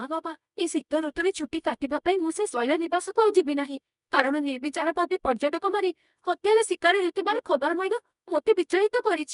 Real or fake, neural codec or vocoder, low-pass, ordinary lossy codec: fake; codec, 24 kHz, 0.9 kbps, WavTokenizer, medium music audio release; 10.8 kHz; none